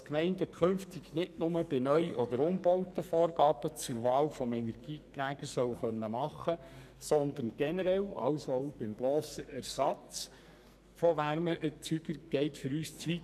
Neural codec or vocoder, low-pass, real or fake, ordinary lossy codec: codec, 44.1 kHz, 2.6 kbps, SNAC; 14.4 kHz; fake; none